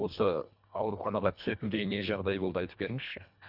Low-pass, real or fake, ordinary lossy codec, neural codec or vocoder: 5.4 kHz; fake; none; codec, 24 kHz, 1.5 kbps, HILCodec